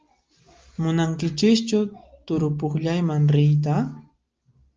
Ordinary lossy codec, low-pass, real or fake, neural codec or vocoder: Opus, 24 kbps; 7.2 kHz; real; none